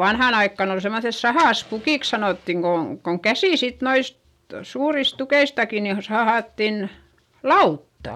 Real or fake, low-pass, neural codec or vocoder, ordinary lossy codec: real; 19.8 kHz; none; none